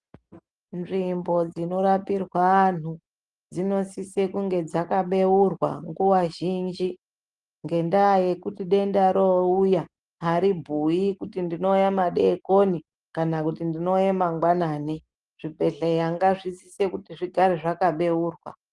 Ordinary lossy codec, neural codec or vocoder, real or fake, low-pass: Opus, 24 kbps; none; real; 10.8 kHz